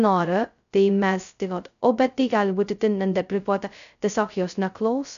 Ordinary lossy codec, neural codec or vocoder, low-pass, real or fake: MP3, 96 kbps; codec, 16 kHz, 0.2 kbps, FocalCodec; 7.2 kHz; fake